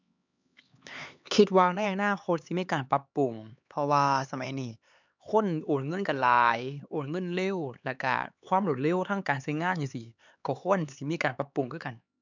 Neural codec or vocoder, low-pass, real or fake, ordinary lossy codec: codec, 16 kHz, 4 kbps, X-Codec, HuBERT features, trained on LibriSpeech; 7.2 kHz; fake; none